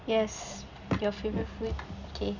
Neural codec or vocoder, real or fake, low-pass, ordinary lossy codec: none; real; 7.2 kHz; none